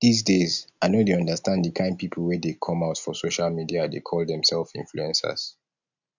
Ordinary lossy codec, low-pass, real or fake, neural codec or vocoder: none; 7.2 kHz; real; none